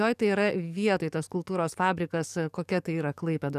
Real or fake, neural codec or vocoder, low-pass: fake; codec, 44.1 kHz, 7.8 kbps, DAC; 14.4 kHz